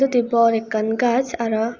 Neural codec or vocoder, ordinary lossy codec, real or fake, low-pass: none; Opus, 64 kbps; real; 7.2 kHz